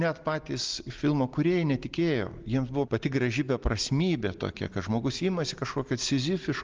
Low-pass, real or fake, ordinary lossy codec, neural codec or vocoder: 7.2 kHz; real; Opus, 32 kbps; none